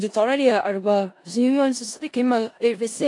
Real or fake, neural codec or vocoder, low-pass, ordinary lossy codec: fake; codec, 16 kHz in and 24 kHz out, 0.4 kbps, LongCat-Audio-Codec, four codebook decoder; 10.8 kHz; AAC, 48 kbps